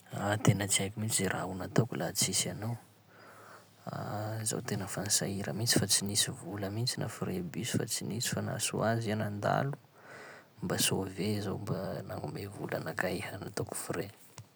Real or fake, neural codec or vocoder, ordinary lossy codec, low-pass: real; none; none; none